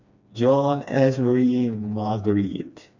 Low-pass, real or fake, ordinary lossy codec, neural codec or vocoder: 7.2 kHz; fake; none; codec, 16 kHz, 2 kbps, FreqCodec, smaller model